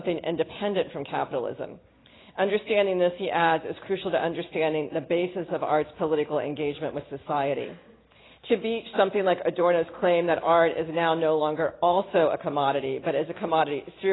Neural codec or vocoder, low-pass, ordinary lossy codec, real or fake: none; 7.2 kHz; AAC, 16 kbps; real